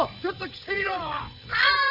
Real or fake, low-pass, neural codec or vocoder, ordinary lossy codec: fake; 5.4 kHz; codec, 16 kHz, 8 kbps, FreqCodec, larger model; none